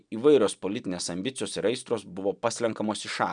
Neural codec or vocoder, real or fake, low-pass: none; real; 9.9 kHz